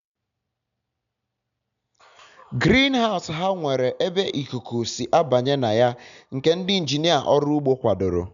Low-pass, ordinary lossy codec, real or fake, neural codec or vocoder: 7.2 kHz; none; real; none